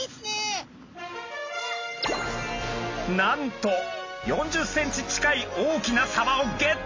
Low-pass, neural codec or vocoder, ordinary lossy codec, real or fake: 7.2 kHz; none; AAC, 48 kbps; real